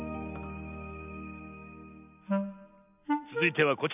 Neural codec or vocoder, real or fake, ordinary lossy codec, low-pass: none; real; none; 3.6 kHz